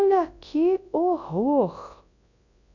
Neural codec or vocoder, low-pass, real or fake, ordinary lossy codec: codec, 24 kHz, 0.9 kbps, WavTokenizer, large speech release; 7.2 kHz; fake; none